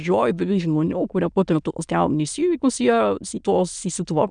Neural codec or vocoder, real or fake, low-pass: autoencoder, 22.05 kHz, a latent of 192 numbers a frame, VITS, trained on many speakers; fake; 9.9 kHz